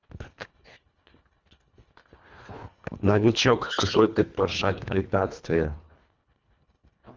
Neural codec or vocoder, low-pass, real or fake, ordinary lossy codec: codec, 24 kHz, 1.5 kbps, HILCodec; 7.2 kHz; fake; Opus, 32 kbps